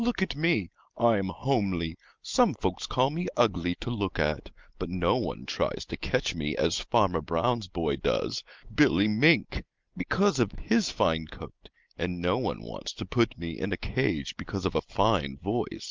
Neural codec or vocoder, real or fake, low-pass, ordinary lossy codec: none; real; 7.2 kHz; Opus, 24 kbps